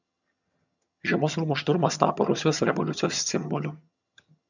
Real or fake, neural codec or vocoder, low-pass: fake; vocoder, 22.05 kHz, 80 mel bands, HiFi-GAN; 7.2 kHz